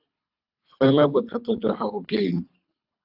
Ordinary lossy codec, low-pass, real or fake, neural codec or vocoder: AAC, 48 kbps; 5.4 kHz; fake; codec, 24 kHz, 3 kbps, HILCodec